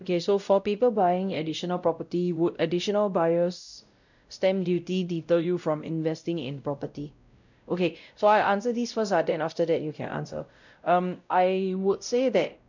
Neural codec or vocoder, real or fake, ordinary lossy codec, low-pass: codec, 16 kHz, 0.5 kbps, X-Codec, WavLM features, trained on Multilingual LibriSpeech; fake; none; 7.2 kHz